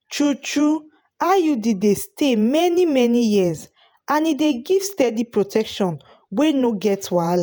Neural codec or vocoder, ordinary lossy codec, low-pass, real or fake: vocoder, 48 kHz, 128 mel bands, Vocos; none; none; fake